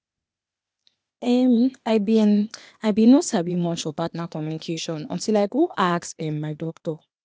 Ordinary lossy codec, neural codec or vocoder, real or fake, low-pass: none; codec, 16 kHz, 0.8 kbps, ZipCodec; fake; none